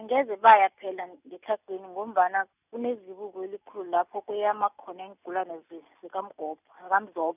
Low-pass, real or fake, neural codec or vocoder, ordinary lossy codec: 3.6 kHz; real; none; none